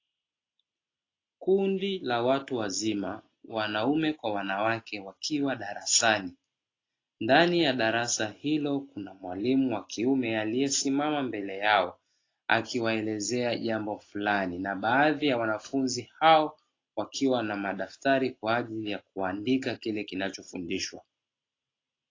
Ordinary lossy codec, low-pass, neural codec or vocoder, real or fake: AAC, 32 kbps; 7.2 kHz; none; real